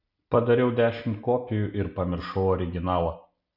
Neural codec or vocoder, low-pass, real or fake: none; 5.4 kHz; real